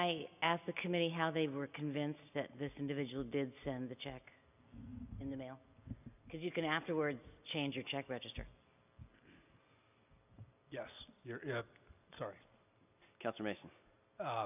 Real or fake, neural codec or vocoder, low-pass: real; none; 3.6 kHz